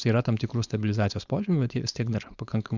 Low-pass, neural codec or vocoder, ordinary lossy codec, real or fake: 7.2 kHz; codec, 16 kHz, 4.8 kbps, FACodec; Opus, 64 kbps; fake